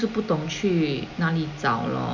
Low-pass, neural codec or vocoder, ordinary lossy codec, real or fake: 7.2 kHz; none; none; real